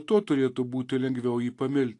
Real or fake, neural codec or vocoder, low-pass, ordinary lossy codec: real; none; 10.8 kHz; AAC, 48 kbps